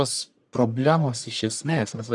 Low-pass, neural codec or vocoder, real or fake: 10.8 kHz; codec, 44.1 kHz, 1.7 kbps, Pupu-Codec; fake